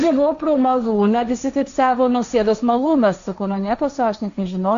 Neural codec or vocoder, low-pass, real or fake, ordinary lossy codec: codec, 16 kHz, 1.1 kbps, Voila-Tokenizer; 7.2 kHz; fake; AAC, 96 kbps